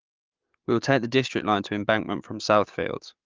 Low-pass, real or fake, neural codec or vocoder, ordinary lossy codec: 7.2 kHz; fake; codec, 44.1 kHz, 7.8 kbps, DAC; Opus, 24 kbps